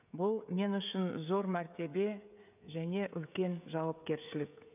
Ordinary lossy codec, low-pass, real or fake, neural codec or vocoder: none; 3.6 kHz; fake; codec, 16 kHz, 16 kbps, FreqCodec, smaller model